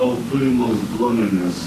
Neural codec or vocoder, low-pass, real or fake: autoencoder, 48 kHz, 32 numbers a frame, DAC-VAE, trained on Japanese speech; 14.4 kHz; fake